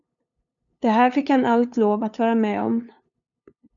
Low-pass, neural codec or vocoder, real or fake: 7.2 kHz; codec, 16 kHz, 2 kbps, FunCodec, trained on LibriTTS, 25 frames a second; fake